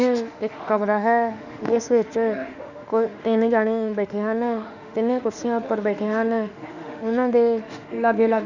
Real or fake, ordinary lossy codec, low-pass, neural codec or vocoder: fake; none; 7.2 kHz; autoencoder, 48 kHz, 32 numbers a frame, DAC-VAE, trained on Japanese speech